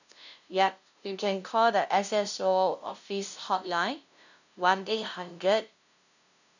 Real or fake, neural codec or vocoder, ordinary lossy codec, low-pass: fake; codec, 16 kHz, 0.5 kbps, FunCodec, trained on LibriTTS, 25 frames a second; none; 7.2 kHz